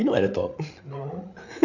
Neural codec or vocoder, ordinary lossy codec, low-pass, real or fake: codec, 16 kHz, 8 kbps, FreqCodec, larger model; none; 7.2 kHz; fake